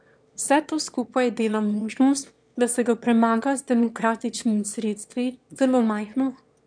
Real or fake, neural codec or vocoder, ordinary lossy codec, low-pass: fake; autoencoder, 22.05 kHz, a latent of 192 numbers a frame, VITS, trained on one speaker; none; 9.9 kHz